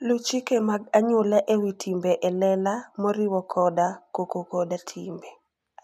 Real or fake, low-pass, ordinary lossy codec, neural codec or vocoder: real; 14.4 kHz; none; none